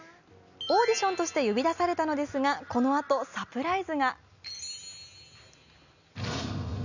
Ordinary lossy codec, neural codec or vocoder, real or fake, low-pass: none; none; real; 7.2 kHz